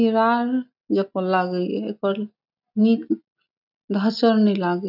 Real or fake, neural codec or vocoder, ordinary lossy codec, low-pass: real; none; none; 5.4 kHz